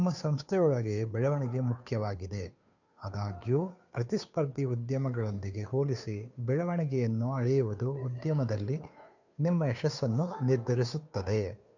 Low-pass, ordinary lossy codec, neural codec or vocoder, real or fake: 7.2 kHz; none; codec, 16 kHz, 2 kbps, FunCodec, trained on Chinese and English, 25 frames a second; fake